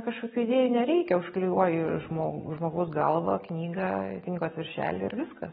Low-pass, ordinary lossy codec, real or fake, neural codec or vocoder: 19.8 kHz; AAC, 16 kbps; real; none